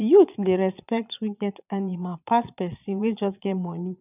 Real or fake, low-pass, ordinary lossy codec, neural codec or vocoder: fake; 3.6 kHz; none; vocoder, 22.05 kHz, 80 mel bands, Vocos